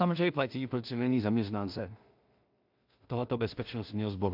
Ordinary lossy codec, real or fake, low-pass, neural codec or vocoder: AAC, 48 kbps; fake; 5.4 kHz; codec, 16 kHz in and 24 kHz out, 0.4 kbps, LongCat-Audio-Codec, two codebook decoder